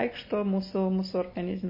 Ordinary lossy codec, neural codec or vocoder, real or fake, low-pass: MP3, 24 kbps; none; real; 5.4 kHz